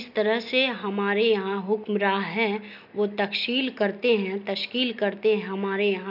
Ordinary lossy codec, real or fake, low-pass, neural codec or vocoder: none; real; 5.4 kHz; none